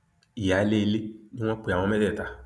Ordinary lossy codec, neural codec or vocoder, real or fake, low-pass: none; none; real; none